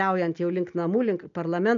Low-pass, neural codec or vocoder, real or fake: 7.2 kHz; none; real